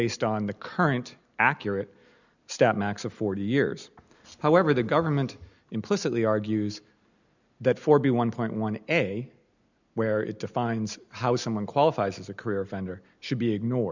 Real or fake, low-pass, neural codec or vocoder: real; 7.2 kHz; none